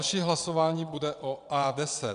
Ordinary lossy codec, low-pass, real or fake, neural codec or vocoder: AAC, 96 kbps; 9.9 kHz; fake; vocoder, 22.05 kHz, 80 mel bands, WaveNeXt